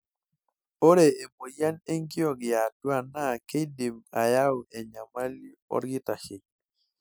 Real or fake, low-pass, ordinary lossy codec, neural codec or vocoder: real; none; none; none